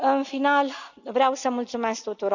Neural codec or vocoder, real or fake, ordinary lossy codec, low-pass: none; real; none; 7.2 kHz